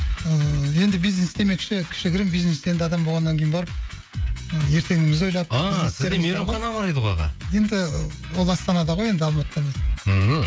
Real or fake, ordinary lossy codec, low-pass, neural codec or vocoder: fake; none; none; codec, 16 kHz, 16 kbps, FreqCodec, smaller model